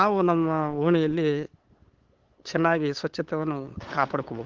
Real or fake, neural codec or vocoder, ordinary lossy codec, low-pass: fake; codec, 16 kHz, 8 kbps, FunCodec, trained on LibriTTS, 25 frames a second; Opus, 16 kbps; 7.2 kHz